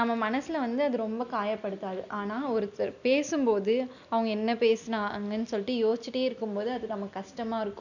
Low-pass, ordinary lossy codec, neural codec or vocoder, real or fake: 7.2 kHz; none; none; real